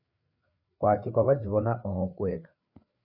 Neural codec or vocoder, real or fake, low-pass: codec, 16 kHz, 4 kbps, FreqCodec, larger model; fake; 5.4 kHz